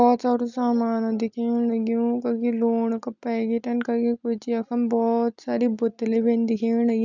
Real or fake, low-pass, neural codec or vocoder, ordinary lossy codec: real; 7.2 kHz; none; none